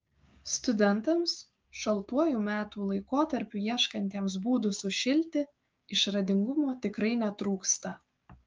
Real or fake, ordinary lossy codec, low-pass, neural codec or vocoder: fake; Opus, 24 kbps; 7.2 kHz; codec, 16 kHz, 6 kbps, DAC